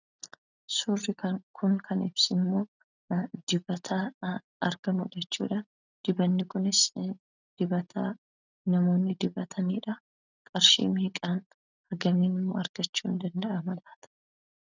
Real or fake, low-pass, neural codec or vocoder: real; 7.2 kHz; none